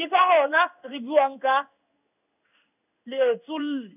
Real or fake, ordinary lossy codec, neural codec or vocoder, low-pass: fake; none; codec, 16 kHz in and 24 kHz out, 1 kbps, XY-Tokenizer; 3.6 kHz